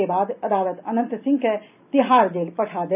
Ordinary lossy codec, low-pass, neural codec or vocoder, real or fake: none; 3.6 kHz; none; real